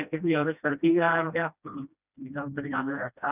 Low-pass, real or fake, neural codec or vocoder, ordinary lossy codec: 3.6 kHz; fake; codec, 16 kHz, 1 kbps, FreqCodec, smaller model; none